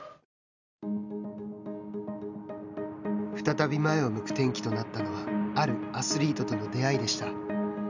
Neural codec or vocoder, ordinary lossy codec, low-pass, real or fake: none; none; 7.2 kHz; real